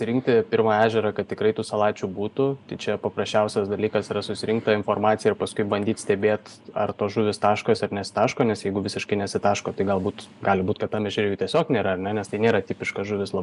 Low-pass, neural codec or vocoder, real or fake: 10.8 kHz; none; real